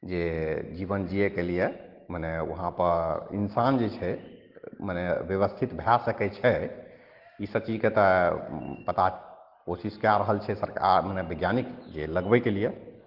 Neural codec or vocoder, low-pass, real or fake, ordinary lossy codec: none; 5.4 kHz; real; Opus, 16 kbps